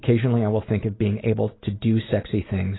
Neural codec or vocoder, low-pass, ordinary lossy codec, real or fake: none; 7.2 kHz; AAC, 16 kbps; real